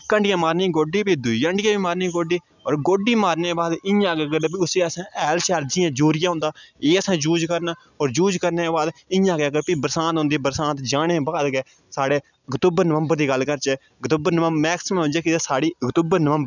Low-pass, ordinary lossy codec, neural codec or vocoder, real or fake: 7.2 kHz; none; none; real